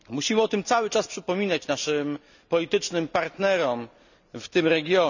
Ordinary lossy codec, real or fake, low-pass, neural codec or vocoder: none; real; 7.2 kHz; none